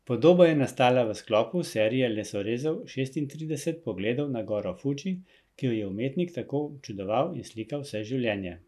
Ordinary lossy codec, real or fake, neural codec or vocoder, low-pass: none; real; none; 14.4 kHz